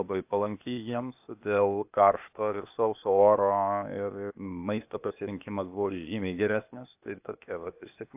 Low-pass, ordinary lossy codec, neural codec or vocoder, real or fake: 3.6 kHz; AAC, 32 kbps; codec, 16 kHz, 0.8 kbps, ZipCodec; fake